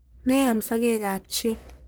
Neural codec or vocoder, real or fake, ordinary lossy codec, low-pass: codec, 44.1 kHz, 1.7 kbps, Pupu-Codec; fake; none; none